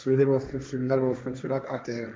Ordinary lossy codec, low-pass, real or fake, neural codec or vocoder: none; none; fake; codec, 16 kHz, 1.1 kbps, Voila-Tokenizer